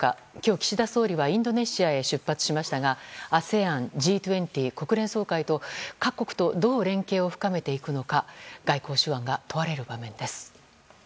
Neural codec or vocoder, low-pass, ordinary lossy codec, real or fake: none; none; none; real